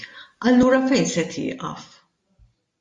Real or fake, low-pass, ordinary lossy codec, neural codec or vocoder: real; 10.8 kHz; MP3, 48 kbps; none